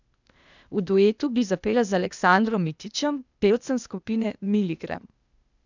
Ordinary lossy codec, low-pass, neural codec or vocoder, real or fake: none; 7.2 kHz; codec, 16 kHz, 0.8 kbps, ZipCodec; fake